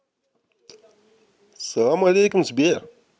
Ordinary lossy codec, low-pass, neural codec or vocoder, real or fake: none; none; none; real